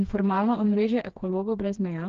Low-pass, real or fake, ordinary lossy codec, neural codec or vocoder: 7.2 kHz; fake; Opus, 16 kbps; codec, 16 kHz, 2 kbps, FreqCodec, smaller model